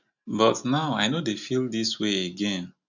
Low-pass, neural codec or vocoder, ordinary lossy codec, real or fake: 7.2 kHz; none; none; real